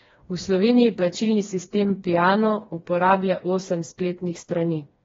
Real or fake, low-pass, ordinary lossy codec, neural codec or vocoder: fake; 7.2 kHz; AAC, 24 kbps; codec, 16 kHz, 2 kbps, FreqCodec, smaller model